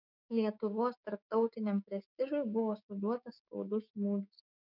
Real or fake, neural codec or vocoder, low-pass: fake; codec, 16 kHz, 6 kbps, DAC; 5.4 kHz